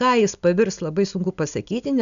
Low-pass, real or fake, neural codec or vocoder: 7.2 kHz; real; none